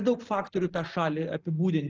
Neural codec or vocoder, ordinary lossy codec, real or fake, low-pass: none; Opus, 16 kbps; real; 7.2 kHz